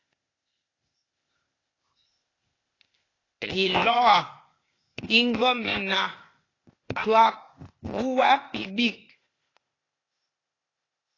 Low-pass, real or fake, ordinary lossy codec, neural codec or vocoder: 7.2 kHz; fake; AAC, 32 kbps; codec, 16 kHz, 0.8 kbps, ZipCodec